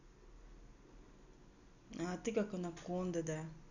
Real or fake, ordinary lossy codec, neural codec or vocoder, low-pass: real; none; none; 7.2 kHz